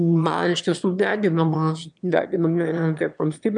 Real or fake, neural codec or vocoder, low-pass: fake; autoencoder, 22.05 kHz, a latent of 192 numbers a frame, VITS, trained on one speaker; 9.9 kHz